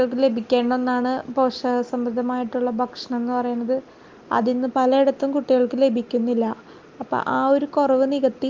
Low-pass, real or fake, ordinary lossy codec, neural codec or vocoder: 7.2 kHz; real; Opus, 32 kbps; none